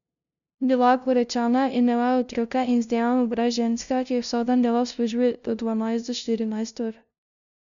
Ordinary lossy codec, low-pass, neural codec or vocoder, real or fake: none; 7.2 kHz; codec, 16 kHz, 0.5 kbps, FunCodec, trained on LibriTTS, 25 frames a second; fake